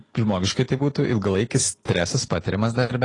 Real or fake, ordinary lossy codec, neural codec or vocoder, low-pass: real; AAC, 32 kbps; none; 10.8 kHz